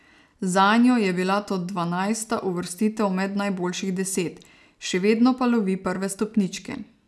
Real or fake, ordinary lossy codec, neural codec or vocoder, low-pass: real; none; none; none